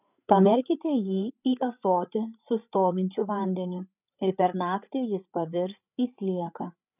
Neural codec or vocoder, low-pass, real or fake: codec, 16 kHz, 8 kbps, FreqCodec, larger model; 3.6 kHz; fake